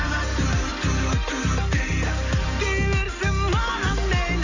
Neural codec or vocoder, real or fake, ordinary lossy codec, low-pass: none; real; none; 7.2 kHz